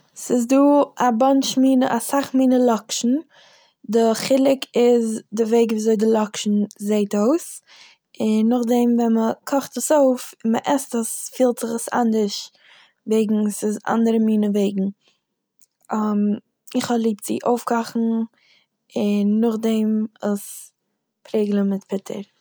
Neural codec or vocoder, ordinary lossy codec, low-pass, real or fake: none; none; none; real